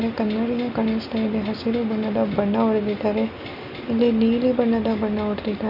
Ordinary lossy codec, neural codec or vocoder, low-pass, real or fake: none; none; 5.4 kHz; real